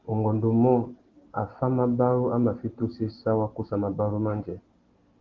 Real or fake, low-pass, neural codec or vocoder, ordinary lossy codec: real; 7.2 kHz; none; Opus, 16 kbps